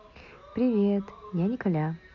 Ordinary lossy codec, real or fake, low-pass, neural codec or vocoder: MP3, 48 kbps; real; 7.2 kHz; none